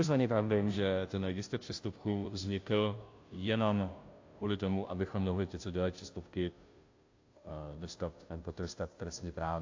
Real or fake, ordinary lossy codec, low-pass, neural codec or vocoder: fake; AAC, 48 kbps; 7.2 kHz; codec, 16 kHz, 0.5 kbps, FunCodec, trained on Chinese and English, 25 frames a second